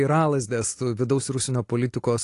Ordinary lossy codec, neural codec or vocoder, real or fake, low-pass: AAC, 48 kbps; none; real; 10.8 kHz